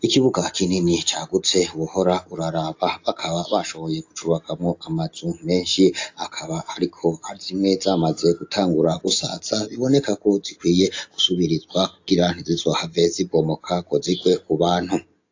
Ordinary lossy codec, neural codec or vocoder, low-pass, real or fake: AAC, 48 kbps; none; 7.2 kHz; real